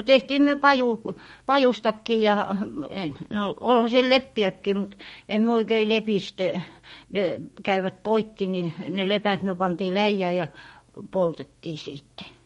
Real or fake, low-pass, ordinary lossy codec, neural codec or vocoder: fake; 14.4 kHz; MP3, 48 kbps; codec, 32 kHz, 1.9 kbps, SNAC